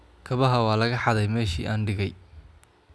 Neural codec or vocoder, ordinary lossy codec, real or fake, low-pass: none; none; real; none